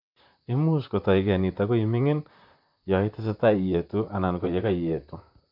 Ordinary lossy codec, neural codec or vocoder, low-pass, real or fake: MP3, 48 kbps; vocoder, 44.1 kHz, 128 mel bands, Pupu-Vocoder; 5.4 kHz; fake